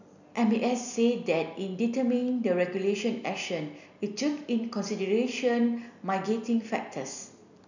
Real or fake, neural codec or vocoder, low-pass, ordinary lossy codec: real; none; 7.2 kHz; none